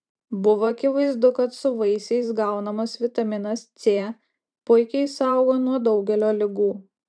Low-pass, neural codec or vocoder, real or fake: 9.9 kHz; vocoder, 44.1 kHz, 128 mel bands every 512 samples, BigVGAN v2; fake